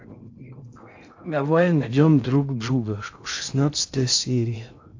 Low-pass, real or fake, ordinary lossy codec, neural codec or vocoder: 7.2 kHz; fake; AAC, 48 kbps; codec, 16 kHz in and 24 kHz out, 0.6 kbps, FocalCodec, streaming, 2048 codes